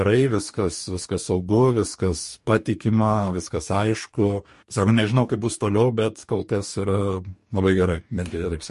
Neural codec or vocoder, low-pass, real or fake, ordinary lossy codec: codec, 44.1 kHz, 2.6 kbps, DAC; 14.4 kHz; fake; MP3, 48 kbps